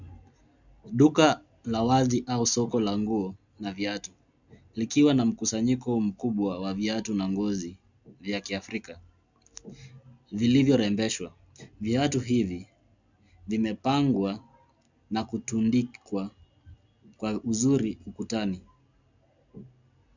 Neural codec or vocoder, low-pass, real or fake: none; 7.2 kHz; real